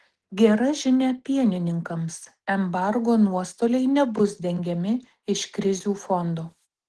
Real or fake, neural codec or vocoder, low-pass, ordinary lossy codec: real; none; 10.8 kHz; Opus, 16 kbps